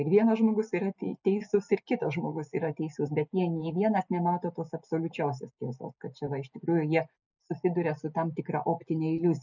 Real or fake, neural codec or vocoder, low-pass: real; none; 7.2 kHz